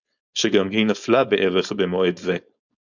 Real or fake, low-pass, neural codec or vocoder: fake; 7.2 kHz; codec, 16 kHz, 4.8 kbps, FACodec